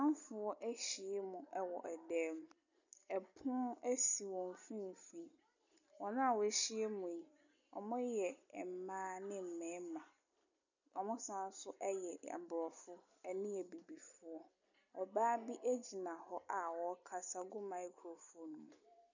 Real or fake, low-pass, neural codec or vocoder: real; 7.2 kHz; none